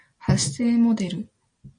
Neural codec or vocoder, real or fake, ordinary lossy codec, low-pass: none; real; MP3, 48 kbps; 9.9 kHz